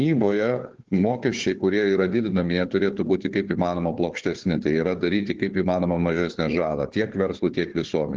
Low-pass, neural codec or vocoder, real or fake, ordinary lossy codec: 7.2 kHz; codec, 16 kHz, 4 kbps, FunCodec, trained on Chinese and English, 50 frames a second; fake; Opus, 16 kbps